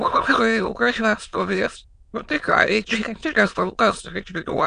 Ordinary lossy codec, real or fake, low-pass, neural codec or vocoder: AAC, 96 kbps; fake; 9.9 kHz; autoencoder, 22.05 kHz, a latent of 192 numbers a frame, VITS, trained on many speakers